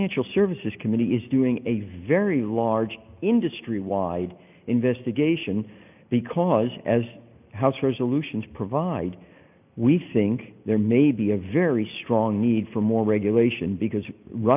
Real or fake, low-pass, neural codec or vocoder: real; 3.6 kHz; none